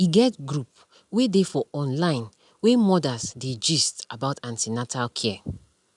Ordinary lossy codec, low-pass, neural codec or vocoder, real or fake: none; 10.8 kHz; none; real